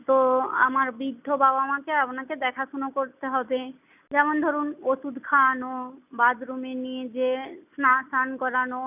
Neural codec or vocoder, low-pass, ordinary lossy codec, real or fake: none; 3.6 kHz; none; real